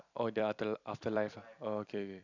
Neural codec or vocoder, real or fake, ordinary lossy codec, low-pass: vocoder, 44.1 kHz, 128 mel bands every 256 samples, BigVGAN v2; fake; none; 7.2 kHz